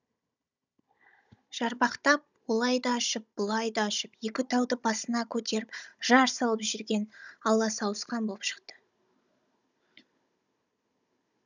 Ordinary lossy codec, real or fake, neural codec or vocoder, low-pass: none; fake; codec, 16 kHz, 16 kbps, FunCodec, trained on Chinese and English, 50 frames a second; 7.2 kHz